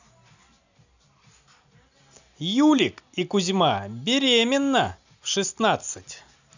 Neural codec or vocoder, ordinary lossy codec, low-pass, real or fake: none; none; 7.2 kHz; real